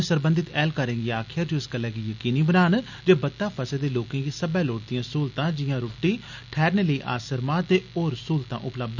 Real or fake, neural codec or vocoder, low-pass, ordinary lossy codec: real; none; 7.2 kHz; none